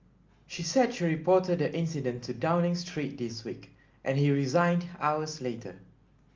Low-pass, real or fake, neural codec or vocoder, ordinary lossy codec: 7.2 kHz; real; none; Opus, 32 kbps